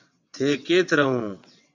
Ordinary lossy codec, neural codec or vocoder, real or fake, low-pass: AAC, 48 kbps; vocoder, 22.05 kHz, 80 mel bands, WaveNeXt; fake; 7.2 kHz